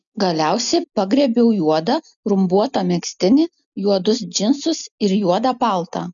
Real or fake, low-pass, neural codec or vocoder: real; 7.2 kHz; none